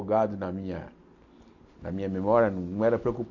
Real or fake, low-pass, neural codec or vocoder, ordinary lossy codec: real; 7.2 kHz; none; none